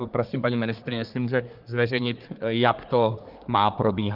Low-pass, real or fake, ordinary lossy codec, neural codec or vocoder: 5.4 kHz; fake; Opus, 24 kbps; codec, 24 kHz, 1 kbps, SNAC